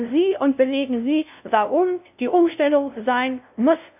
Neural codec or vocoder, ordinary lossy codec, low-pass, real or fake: codec, 16 kHz, 0.5 kbps, FunCodec, trained on LibriTTS, 25 frames a second; AAC, 32 kbps; 3.6 kHz; fake